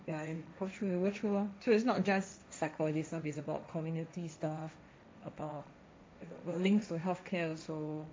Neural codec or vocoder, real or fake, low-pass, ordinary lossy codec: codec, 16 kHz, 1.1 kbps, Voila-Tokenizer; fake; none; none